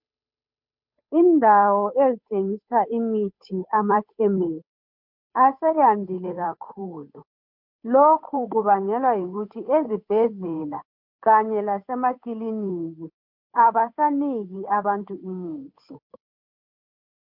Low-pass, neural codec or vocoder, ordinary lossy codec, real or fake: 5.4 kHz; codec, 16 kHz, 8 kbps, FunCodec, trained on Chinese and English, 25 frames a second; MP3, 48 kbps; fake